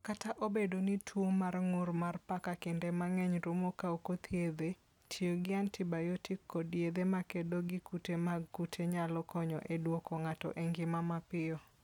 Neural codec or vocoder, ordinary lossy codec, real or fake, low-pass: none; none; real; 19.8 kHz